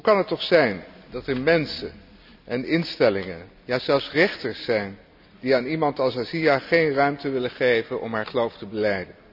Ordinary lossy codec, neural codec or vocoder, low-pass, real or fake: none; none; 5.4 kHz; real